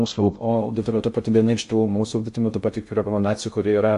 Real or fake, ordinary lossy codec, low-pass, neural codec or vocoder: fake; AAC, 64 kbps; 10.8 kHz; codec, 16 kHz in and 24 kHz out, 0.6 kbps, FocalCodec, streaming, 2048 codes